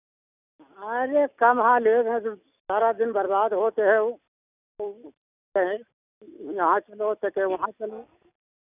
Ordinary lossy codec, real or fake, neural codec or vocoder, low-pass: none; real; none; 3.6 kHz